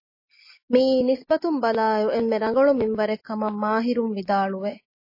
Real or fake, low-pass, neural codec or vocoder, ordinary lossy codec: real; 5.4 kHz; none; MP3, 24 kbps